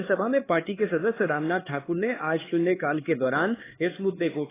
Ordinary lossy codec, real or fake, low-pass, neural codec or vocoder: AAC, 16 kbps; fake; 3.6 kHz; codec, 16 kHz, 4 kbps, X-Codec, HuBERT features, trained on LibriSpeech